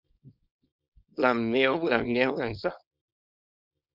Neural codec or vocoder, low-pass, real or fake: codec, 24 kHz, 0.9 kbps, WavTokenizer, small release; 5.4 kHz; fake